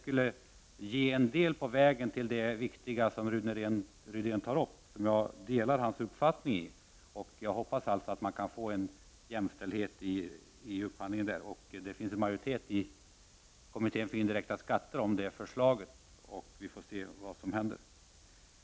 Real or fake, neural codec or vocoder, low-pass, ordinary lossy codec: real; none; none; none